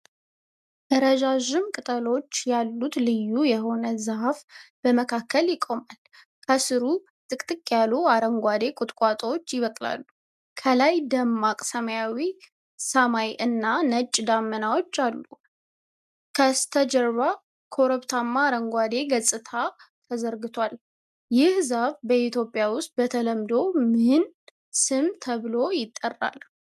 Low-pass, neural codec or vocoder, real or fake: 14.4 kHz; none; real